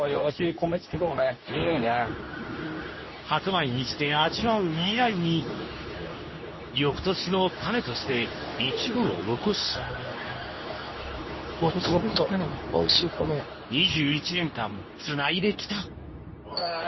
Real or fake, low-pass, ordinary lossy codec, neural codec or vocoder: fake; 7.2 kHz; MP3, 24 kbps; codec, 24 kHz, 0.9 kbps, WavTokenizer, medium speech release version 2